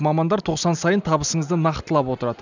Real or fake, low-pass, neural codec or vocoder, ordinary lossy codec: real; 7.2 kHz; none; none